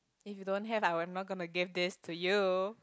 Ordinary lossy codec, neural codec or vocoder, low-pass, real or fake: none; none; none; real